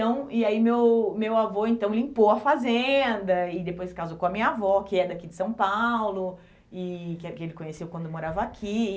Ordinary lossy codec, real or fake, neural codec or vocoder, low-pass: none; real; none; none